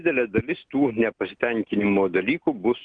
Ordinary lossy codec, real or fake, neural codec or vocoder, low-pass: Opus, 24 kbps; real; none; 9.9 kHz